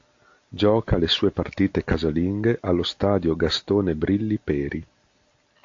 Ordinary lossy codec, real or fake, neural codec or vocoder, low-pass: AAC, 48 kbps; real; none; 7.2 kHz